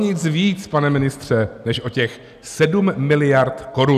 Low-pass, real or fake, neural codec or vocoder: 14.4 kHz; real; none